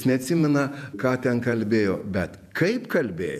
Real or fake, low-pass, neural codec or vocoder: fake; 14.4 kHz; vocoder, 48 kHz, 128 mel bands, Vocos